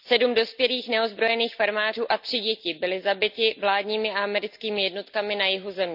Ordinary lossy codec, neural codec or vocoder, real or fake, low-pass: none; none; real; 5.4 kHz